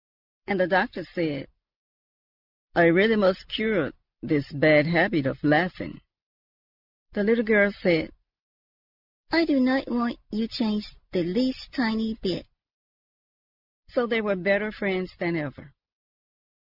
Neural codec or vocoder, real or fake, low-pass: none; real; 5.4 kHz